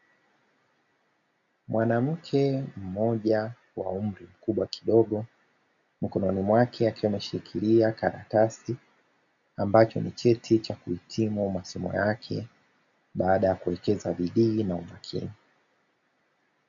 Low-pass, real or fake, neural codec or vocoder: 7.2 kHz; real; none